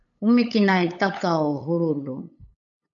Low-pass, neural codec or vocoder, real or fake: 7.2 kHz; codec, 16 kHz, 8 kbps, FunCodec, trained on LibriTTS, 25 frames a second; fake